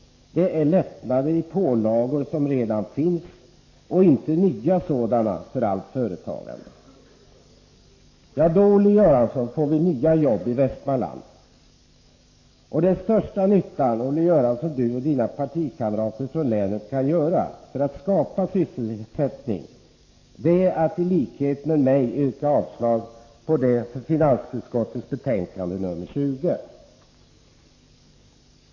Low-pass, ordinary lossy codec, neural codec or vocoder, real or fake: 7.2 kHz; AAC, 48 kbps; codec, 44.1 kHz, 7.8 kbps, DAC; fake